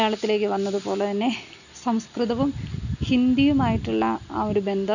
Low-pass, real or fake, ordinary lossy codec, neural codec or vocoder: 7.2 kHz; real; none; none